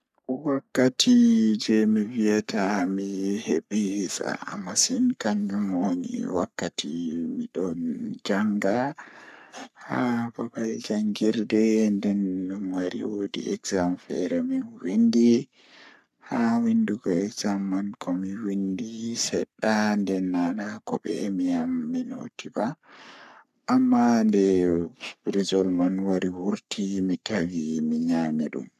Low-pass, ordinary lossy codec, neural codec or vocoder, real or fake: 14.4 kHz; none; codec, 44.1 kHz, 3.4 kbps, Pupu-Codec; fake